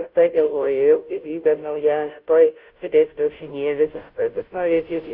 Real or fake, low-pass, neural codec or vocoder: fake; 5.4 kHz; codec, 16 kHz, 0.5 kbps, FunCodec, trained on Chinese and English, 25 frames a second